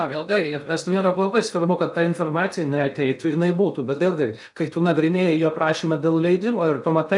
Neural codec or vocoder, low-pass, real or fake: codec, 16 kHz in and 24 kHz out, 0.6 kbps, FocalCodec, streaming, 2048 codes; 10.8 kHz; fake